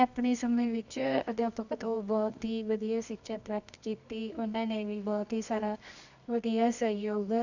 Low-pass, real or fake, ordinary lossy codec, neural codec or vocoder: 7.2 kHz; fake; none; codec, 24 kHz, 0.9 kbps, WavTokenizer, medium music audio release